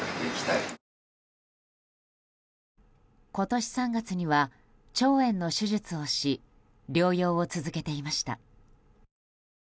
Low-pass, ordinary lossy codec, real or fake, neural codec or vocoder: none; none; real; none